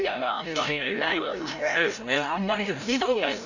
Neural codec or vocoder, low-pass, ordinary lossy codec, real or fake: codec, 16 kHz, 0.5 kbps, FreqCodec, larger model; 7.2 kHz; none; fake